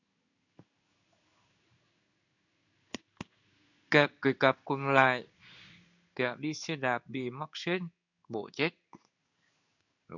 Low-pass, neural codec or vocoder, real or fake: 7.2 kHz; codec, 16 kHz in and 24 kHz out, 1 kbps, XY-Tokenizer; fake